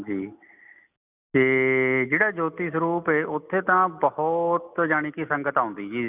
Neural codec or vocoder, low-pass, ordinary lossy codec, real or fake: none; 3.6 kHz; none; real